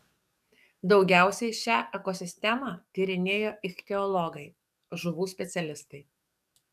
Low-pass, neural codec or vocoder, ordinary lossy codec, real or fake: 14.4 kHz; codec, 44.1 kHz, 7.8 kbps, DAC; MP3, 96 kbps; fake